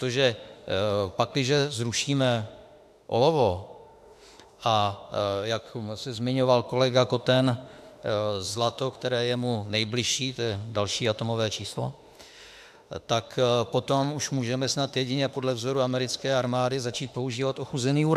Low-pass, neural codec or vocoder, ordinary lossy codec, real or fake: 14.4 kHz; autoencoder, 48 kHz, 32 numbers a frame, DAC-VAE, trained on Japanese speech; AAC, 96 kbps; fake